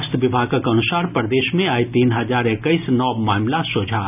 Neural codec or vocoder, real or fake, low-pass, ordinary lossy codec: none; real; 3.6 kHz; none